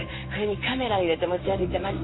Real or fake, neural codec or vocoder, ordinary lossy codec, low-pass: fake; codec, 16 kHz in and 24 kHz out, 1 kbps, XY-Tokenizer; AAC, 16 kbps; 7.2 kHz